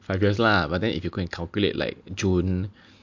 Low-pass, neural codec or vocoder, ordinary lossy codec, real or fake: 7.2 kHz; none; MP3, 48 kbps; real